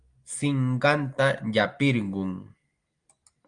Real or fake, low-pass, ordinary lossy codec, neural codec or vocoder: real; 9.9 kHz; Opus, 32 kbps; none